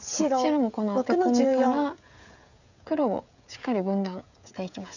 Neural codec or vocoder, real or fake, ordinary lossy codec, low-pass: codec, 16 kHz, 16 kbps, FreqCodec, smaller model; fake; none; 7.2 kHz